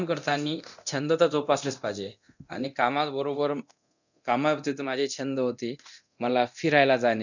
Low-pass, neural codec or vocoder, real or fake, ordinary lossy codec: 7.2 kHz; codec, 24 kHz, 0.9 kbps, DualCodec; fake; none